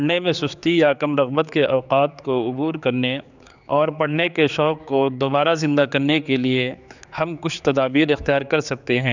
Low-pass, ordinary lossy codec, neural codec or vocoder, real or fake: 7.2 kHz; none; codec, 16 kHz, 4 kbps, X-Codec, HuBERT features, trained on general audio; fake